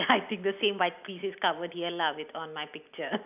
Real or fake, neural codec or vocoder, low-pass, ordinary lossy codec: real; none; 3.6 kHz; none